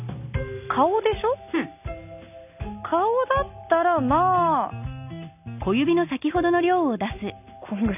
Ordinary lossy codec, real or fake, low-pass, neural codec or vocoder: none; real; 3.6 kHz; none